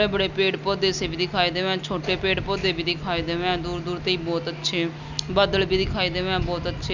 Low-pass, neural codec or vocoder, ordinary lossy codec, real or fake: 7.2 kHz; none; none; real